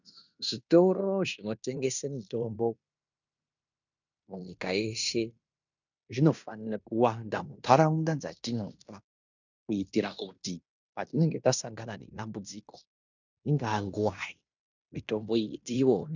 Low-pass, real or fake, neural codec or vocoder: 7.2 kHz; fake; codec, 16 kHz in and 24 kHz out, 0.9 kbps, LongCat-Audio-Codec, fine tuned four codebook decoder